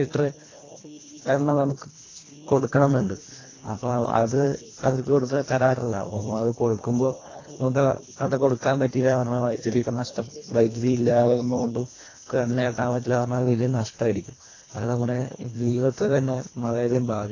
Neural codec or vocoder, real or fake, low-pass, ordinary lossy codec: codec, 24 kHz, 1.5 kbps, HILCodec; fake; 7.2 kHz; AAC, 32 kbps